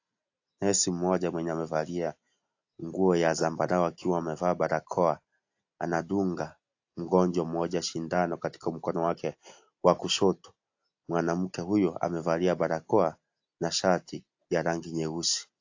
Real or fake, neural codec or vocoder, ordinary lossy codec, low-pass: real; none; AAC, 48 kbps; 7.2 kHz